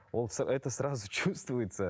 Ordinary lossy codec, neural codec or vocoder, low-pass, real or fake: none; none; none; real